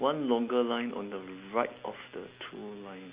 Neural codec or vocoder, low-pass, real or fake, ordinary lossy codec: none; 3.6 kHz; real; Opus, 64 kbps